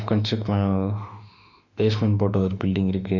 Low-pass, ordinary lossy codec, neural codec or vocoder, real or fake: 7.2 kHz; none; autoencoder, 48 kHz, 32 numbers a frame, DAC-VAE, trained on Japanese speech; fake